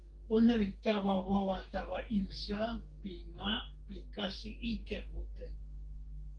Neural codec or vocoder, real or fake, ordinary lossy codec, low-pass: codec, 44.1 kHz, 2.6 kbps, DAC; fake; Opus, 24 kbps; 9.9 kHz